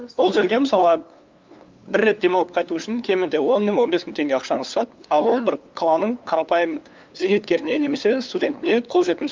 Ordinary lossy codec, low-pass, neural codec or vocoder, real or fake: Opus, 24 kbps; 7.2 kHz; codec, 16 kHz, 2 kbps, FunCodec, trained on LibriTTS, 25 frames a second; fake